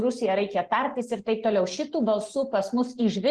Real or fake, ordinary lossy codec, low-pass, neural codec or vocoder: real; Opus, 16 kbps; 10.8 kHz; none